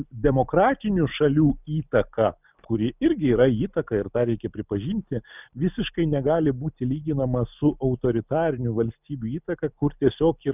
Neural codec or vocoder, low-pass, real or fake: none; 3.6 kHz; real